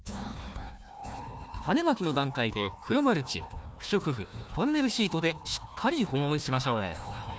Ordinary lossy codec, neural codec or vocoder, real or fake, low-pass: none; codec, 16 kHz, 1 kbps, FunCodec, trained on Chinese and English, 50 frames a second; fake; none